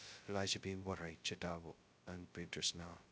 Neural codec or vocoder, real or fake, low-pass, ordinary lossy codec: codec, 16 kHz, 0.2 kbps, FocalCodec; fake; none; none